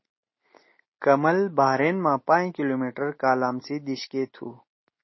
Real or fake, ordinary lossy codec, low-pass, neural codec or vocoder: real; MP3, 24 kbps; 7.2 kHz; none